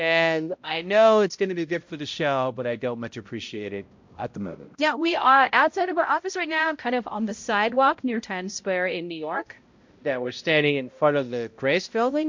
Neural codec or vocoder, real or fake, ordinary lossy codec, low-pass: codec, 16 kHz, 0.5 kbps, X-Codec, HuBERT features, trained on balanced general audio; fake; MP3, 48 kbps; 7.2 kHz